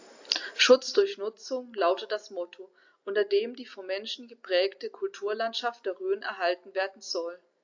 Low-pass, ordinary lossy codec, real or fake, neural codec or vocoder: 7.2 kHz; AAC, 48 kbps; real; none